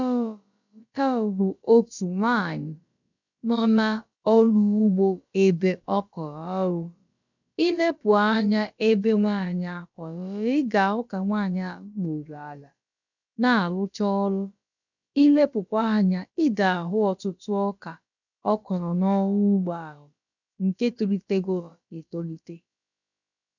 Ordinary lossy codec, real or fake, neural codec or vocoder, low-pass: none; fake; codec, 16 kHz, about 1 kbps, DyCAST, with the encoder's durations; 7.2 kHz